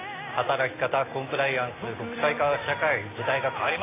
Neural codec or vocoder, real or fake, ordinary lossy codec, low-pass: none; real; AAC, 16 kbps; 3.6 kHz